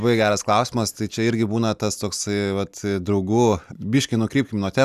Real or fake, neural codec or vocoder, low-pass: real; none; 14.4 kHz